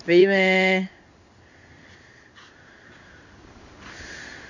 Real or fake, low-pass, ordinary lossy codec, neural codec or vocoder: fake; 7.2 kHz; none; codec, 16 kHz in and 24 kHz out, 1 kbps, XY-Tokenizer